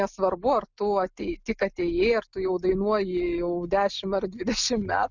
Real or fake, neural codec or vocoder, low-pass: real; none; 7.2 kHz